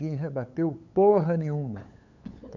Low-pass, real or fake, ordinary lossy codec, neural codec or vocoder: 7.2 kHz; fake; none; codec, 16 kHz, 8 kbps, FunCodec, trained on LibriTTS, 25 frames a second